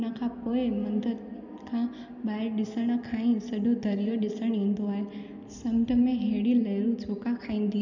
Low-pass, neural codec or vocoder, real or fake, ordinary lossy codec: 7.2 kHz; none; real; none